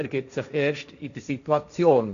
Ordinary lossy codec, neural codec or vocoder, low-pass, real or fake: none; codec, 16 kHz, 1.1 kbps, Voila-Tokenizer; 7.2 kHz; fake